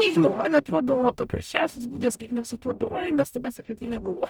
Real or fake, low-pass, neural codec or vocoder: fake; 19.8 kHz; codec, 44.1 kHz, 0.9 kbps, DAC